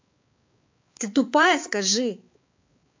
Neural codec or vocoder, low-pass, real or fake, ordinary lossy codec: codec, 16 kHz, 4 kbps, X-Codec, HuBERT features, trained on balanced general audio; 7.2 kHz; fake; MP3, 48 kbps